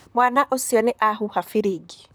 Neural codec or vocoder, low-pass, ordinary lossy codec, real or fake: vocoder, 44.1 kHz, 128 mel bands, Pupu-Vocoder; none; none; fake